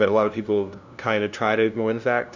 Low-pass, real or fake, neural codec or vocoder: 7.2 kHz; fake; codec, 16 kHz, 0.5 kbps, FunCodec, trained on LibriTTS, 25 frames a second